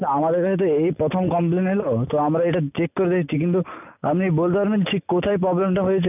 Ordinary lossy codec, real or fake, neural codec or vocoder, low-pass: none; real; none; 3.6 kHz